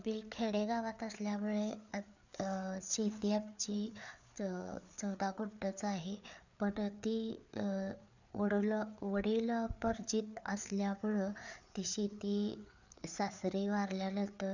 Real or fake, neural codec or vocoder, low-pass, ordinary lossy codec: fake; codec, 16 kHz, 4 kbps, FreqCodec, larger model; 7.2 kHz; none